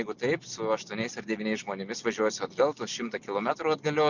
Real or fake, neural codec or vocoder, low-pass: real; none; 7.2 kHz